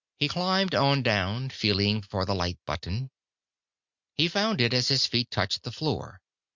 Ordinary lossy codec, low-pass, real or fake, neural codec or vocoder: Opus, 64 kbps; 7.2 kHz; real; none